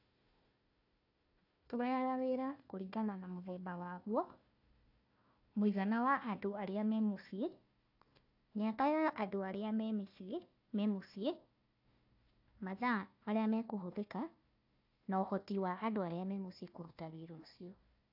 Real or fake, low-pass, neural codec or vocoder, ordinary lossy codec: fake; 5.4 kHz; codec, 16 kHz, 1 kbps, FunCodec, trained on Chinese and English, 50 frames a second; AAC, 48 kbps